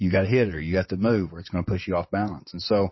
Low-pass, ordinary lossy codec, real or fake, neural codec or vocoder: 7.2 kHz; MP3, 24 kbps; fake; vocoder, 22.05 kHz, 80 mel bands, WaveNeXt